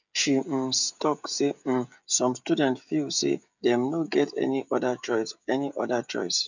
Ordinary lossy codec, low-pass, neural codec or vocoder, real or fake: none; 7.2 kHz; codec, 16 kHz, 16 kbps, FreqCodec, smaller model; fake